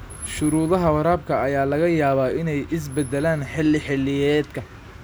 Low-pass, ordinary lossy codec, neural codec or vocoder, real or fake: none; none; none; real